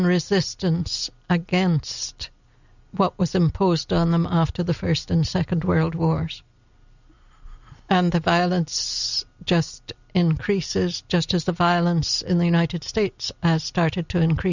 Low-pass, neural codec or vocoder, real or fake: 7.2 kHz; none; real